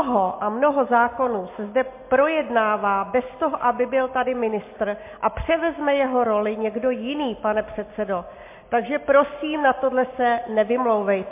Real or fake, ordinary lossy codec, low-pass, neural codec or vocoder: real; MP3, 24 kbps; 3.6 kHz; none